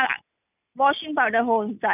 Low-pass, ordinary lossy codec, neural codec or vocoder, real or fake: 3.6 kHz; none; none; real